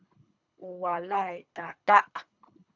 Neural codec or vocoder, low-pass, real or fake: codec, 24 kHz, 3 kbps, HILCodec; 7.2 kHz; fake